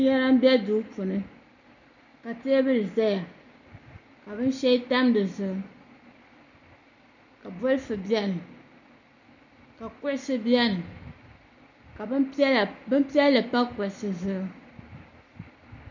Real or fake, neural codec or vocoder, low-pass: real; none; 7.2 kHz